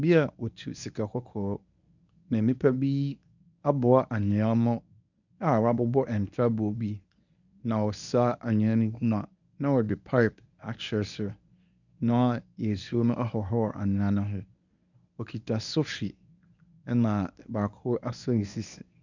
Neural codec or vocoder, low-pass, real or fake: codec, 24 kHz, 0.9 kbps, WavTokenizer, medium speech release version 1; 7.2 kHz; fake